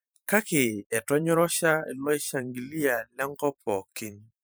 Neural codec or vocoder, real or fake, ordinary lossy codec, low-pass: vocoder, 44.1 kHz, 128 mel bands every 512 samples, BigVGAN v2; fake; none; none